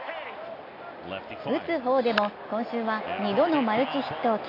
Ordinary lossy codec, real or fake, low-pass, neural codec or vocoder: AAC, 32 kbps; real; 5.4 kHz; none